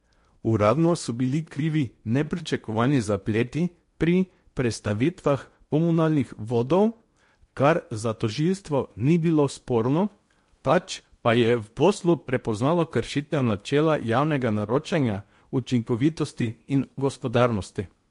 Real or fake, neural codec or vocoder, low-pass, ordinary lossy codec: fake; codec, 16 kHz in and 24 kHz out, 0.8 kbps, FocalCodec, streaming, 65536 codes; 10.8 kHz; MP3, 48 kbps